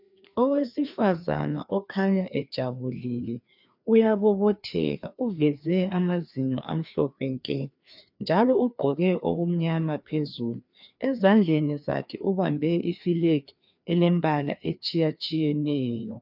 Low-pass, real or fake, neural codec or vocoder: 5.4 kHz; fake; codec, 16 kHz, 2 kbps, FreqCodec, larger model